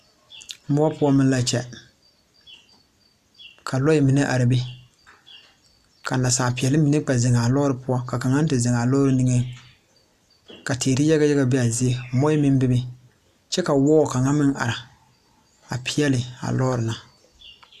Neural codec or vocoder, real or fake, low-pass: none; real; 14.4 kHz